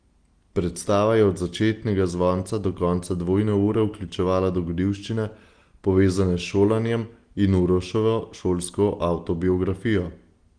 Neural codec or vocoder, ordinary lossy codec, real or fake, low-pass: none; Opus, 24 kbps; real; 9.9 kHz